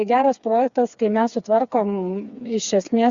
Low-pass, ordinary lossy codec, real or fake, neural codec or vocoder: 7.2 kHz; Opus, 64 kbps; fake; codec, 16 kHz, 4 kbps, FreqCodec, smaller model